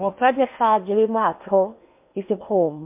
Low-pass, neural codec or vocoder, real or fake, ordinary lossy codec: 3.6 kHz; codec, 16 kHz in and 24 kHz out, 0.8 kbps, FocalCodec, streaming, 65536 codes; fake; MP3, 32 kbps